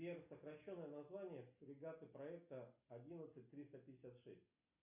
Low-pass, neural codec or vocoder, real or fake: 3.6 kHz; none; real